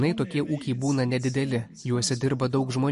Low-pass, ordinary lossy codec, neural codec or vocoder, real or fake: 14.4 kHz; MP3, 48 kbps; none; real